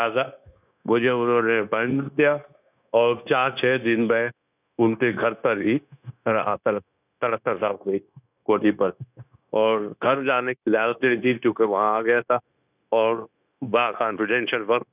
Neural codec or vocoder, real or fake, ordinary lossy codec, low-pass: codec, 16 kHz, 0.9 kbps, LongCat-Audio-Codec; fake; none; 3.6 kHz